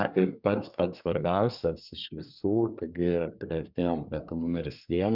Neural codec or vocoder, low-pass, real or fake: codec, 24 kHz, 1 kbps, SNAC; 5.4 kHz; fake